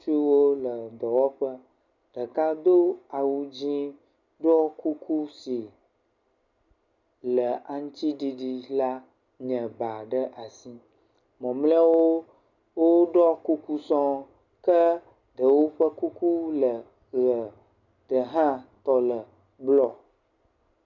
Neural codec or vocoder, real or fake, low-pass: none; real; 7.2 kHz